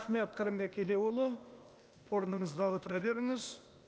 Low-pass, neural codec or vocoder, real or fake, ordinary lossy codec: none; codec, 16 kHz, 0.8 kbps, ZipCodec; fake; none